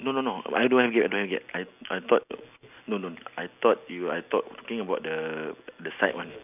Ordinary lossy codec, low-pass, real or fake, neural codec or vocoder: none; 3.6 kHz; real; none